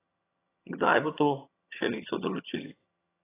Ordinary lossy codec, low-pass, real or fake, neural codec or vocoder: AAC, 16 kbps; 3.6 kHz; fake; vocoder, 22.05 kHz, 80 mel bands, HiFi-GAN